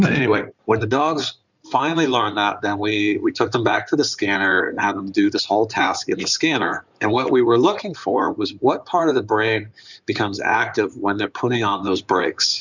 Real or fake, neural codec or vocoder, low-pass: fake; codec, 16 kHz in and 24 kHz out, 2.2 kbps, FireRedTTS-2 codec; 7.2 kHz